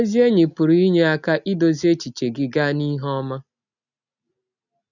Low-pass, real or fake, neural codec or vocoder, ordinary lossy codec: 7.2 kHz; real; none; none